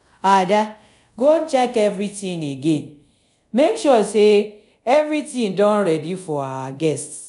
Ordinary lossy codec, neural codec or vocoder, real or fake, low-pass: none; codec, 24 kHz, 0.5 kbps, DualCodec; fake; 10.8 kHz